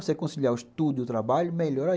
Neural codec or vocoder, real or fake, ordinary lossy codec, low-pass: none; real; none; none